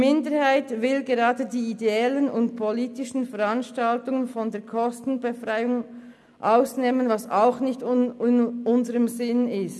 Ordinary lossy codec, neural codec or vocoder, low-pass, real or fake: none; none; none; real